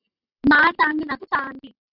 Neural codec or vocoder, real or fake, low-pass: none; real; 5.4 kHz